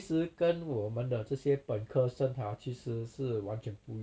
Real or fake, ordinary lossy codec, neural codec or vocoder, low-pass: real; none; none; none